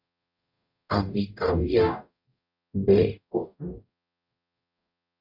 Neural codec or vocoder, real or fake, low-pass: codec, 44.1 kHz, 0.9 kbps, DAC; fake; 5.4 kHz